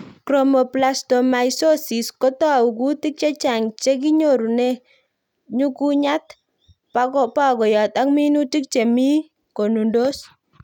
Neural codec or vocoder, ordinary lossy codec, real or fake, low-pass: none; none; real; 19.8 kHz